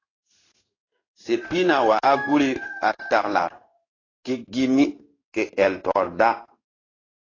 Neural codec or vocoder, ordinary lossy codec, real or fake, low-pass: codec, 16 kHz in and 24 kHz out, 1 kbps, XY-Tokenizer; AAC, 32 kbps; fake; 7.2 kHz